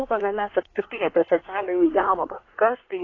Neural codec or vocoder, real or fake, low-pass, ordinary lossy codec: codec, 24 kHz, 1 kbps, SNAC; fake; 7.2 kHz; AAC, 32 kbps